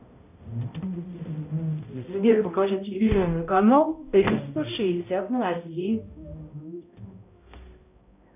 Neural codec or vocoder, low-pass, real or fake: codec, 16 kHz, 0.5 kbps, X-Codec, HuBERT features, trained on balanced general audio; 3.6 kHz; fake